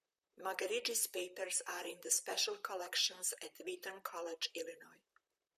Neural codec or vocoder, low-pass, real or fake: vocoder, 44.1 kHz, 128 mel bands, Pupu-Vocoder; 14.4 kHz; fake